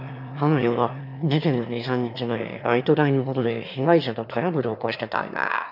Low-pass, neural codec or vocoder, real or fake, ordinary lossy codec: 5.4 kHz; autoencoder, 22.05 kHz, a latent of 192 numbers a frame, VITS, trained on one speaker; fake; none